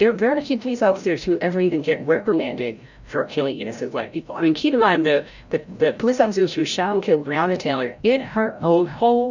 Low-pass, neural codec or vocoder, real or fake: 7.2 kHz; codec, 16 kHz, 0.5 kbps, FreqCodec, larger model; fake